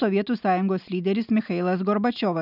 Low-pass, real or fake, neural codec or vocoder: 5.4 kHz; real; none